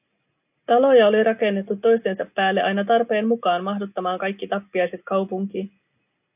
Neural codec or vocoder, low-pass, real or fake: none; 3.6 kHz; real